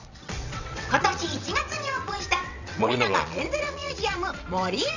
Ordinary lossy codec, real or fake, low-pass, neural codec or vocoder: none; fake; 7.2 kHz; vocoder, 22.05 kHz, 80 mel bands, WaveNeXt